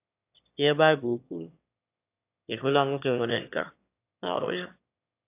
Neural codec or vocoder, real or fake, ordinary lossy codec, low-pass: autoencoder, 22.05 kHz, a latent of 192 numbers a frame, VITS, trained on one speaker; fake; AAC, 32 kbps; 3.6 kHz